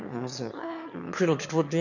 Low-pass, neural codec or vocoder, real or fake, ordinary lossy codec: 7.2 kHz; autoencoder, 22.05 kHz, a latent of 192 numbers a frame, VITS, trained on one speaker; fake; none